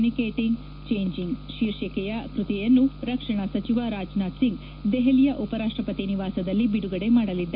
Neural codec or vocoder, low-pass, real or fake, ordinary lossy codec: none; 5.4 kHz; real; none